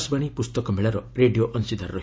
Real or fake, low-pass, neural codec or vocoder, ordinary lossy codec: real; none; none; none